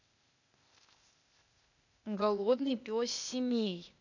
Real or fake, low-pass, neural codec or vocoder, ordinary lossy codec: fake; 7.2 kHz; codec, 16 kHz, 0.8 kbps, ZipCodec; none